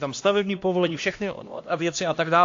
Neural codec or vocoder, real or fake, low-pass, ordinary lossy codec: codec, 16 kHz, 1 kbps, X-Codec, HuBERT features, trained on LibriSpeech; fake; 7.2 kHz; AAC, 48 kbps